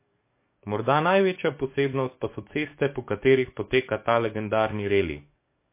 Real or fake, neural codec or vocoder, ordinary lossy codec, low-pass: fake; codec, 44.1 kHz, 7.8 kbps, DAC; MP3, 24 kbps; 3.6 kHz